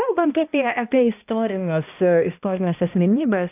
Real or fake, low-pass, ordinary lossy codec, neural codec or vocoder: fake; 3.6 kHz; AAC, 32 kbps; codec, 16 kHz, 1 kbps, X-Codec, HuBERT features, trained on balanced general audio